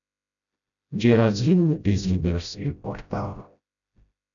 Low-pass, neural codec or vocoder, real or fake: 7.2 kHz; codec, 16 kHz, 0.5 kbps, FreqCodec, smaller model; fake